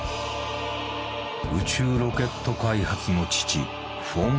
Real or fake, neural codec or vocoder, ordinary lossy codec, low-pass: real; none; none; none